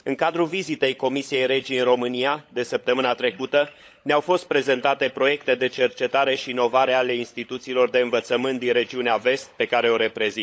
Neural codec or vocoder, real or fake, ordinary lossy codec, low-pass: codec, 16 kHz, 16 kbps, FunCodec, trained on LibriTTS, 50 frames a second; fake; none; none